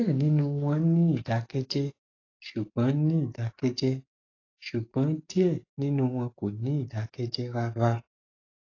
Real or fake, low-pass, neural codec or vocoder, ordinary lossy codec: fake; 7.2 kHz; codec, 44.1 kHz, 7.8 kbps, DAC; AAC, 32 kbps